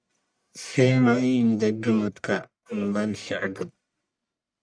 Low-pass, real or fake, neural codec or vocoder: 9.9 kHz; fake; codec, 44.1 kHz, 1.7 kbps, Pupu-Codec